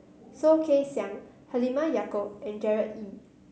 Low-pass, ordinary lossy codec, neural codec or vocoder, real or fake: none; none; none; real